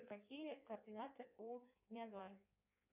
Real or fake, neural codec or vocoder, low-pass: fake; codec, 16 kHz in and 24 kHz out, 1.1 kbps, FireRedTTS-2 codec; 3.6 kHz